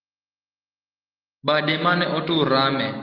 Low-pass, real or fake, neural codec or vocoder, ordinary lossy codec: 5.4 kHz; real; none; Opus, 16 kbps